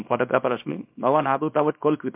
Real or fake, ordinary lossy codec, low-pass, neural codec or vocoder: fake; MP3, 32 kbps; 3.6 kHz; codec, 24 kHz, 0.9 kbps, WavTokenizer, small release